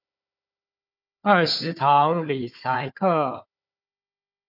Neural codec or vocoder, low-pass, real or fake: codec, 16 kHz, 4 kbps, FunCodec, trained on Chinese and English, 50 frames a second; 5.4 kHz; fake